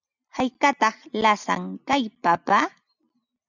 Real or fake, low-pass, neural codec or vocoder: real; 7.2 kHz; none